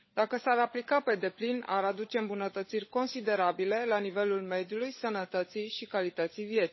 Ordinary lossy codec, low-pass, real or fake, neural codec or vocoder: MP3, 24 kbps; 7.2 kHz; fake; codec, 16 kHz, 16 kbps, FunCodec, trained on LibriTTS, 50 frames a second